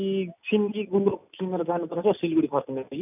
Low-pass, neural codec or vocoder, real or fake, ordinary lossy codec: 3.6 kHz; none; real; none